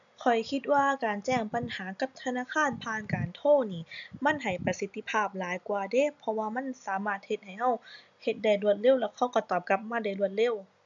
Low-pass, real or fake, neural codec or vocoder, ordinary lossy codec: 7.2 kHz; real; none; none